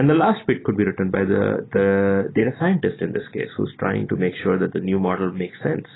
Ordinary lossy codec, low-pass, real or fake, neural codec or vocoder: AAC, 16 kbps; 7.2 kHz; real; none